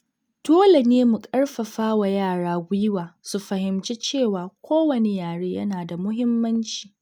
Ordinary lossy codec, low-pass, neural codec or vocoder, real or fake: none; 19.8 kHz; none; real